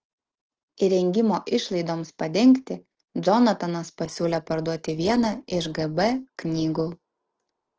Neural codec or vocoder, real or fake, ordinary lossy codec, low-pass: none; real; Opus, 16 kbps; 7.2 kHz